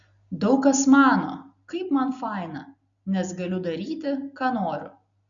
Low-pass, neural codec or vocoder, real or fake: 7.2 kHz; none; real